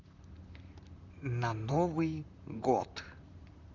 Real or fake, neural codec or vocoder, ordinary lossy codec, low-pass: fake; vocoder, 44.1 kHz, 128 mel bands, Pupu-Vocoder; Opus, 64 kbps; 7.2 kHz